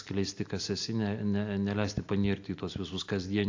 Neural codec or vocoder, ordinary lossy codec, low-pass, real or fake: none; AAC, 48 kbps; 7.2 kHz; real